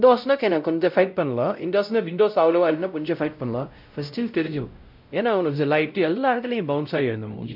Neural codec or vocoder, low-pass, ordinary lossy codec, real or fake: codec, 16 kHz, 0.5 kbps, X-Codec, WavLM features, trained on Multilingual LibriSpeech; 5.4 kHz; none; fake